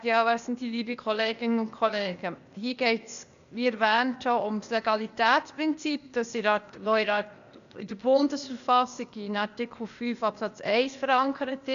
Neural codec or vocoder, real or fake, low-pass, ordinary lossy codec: codec, 16 kHz, 0.8 kbps, ZipCodec; fake; 7.2 kHz; MP3, 96 kbps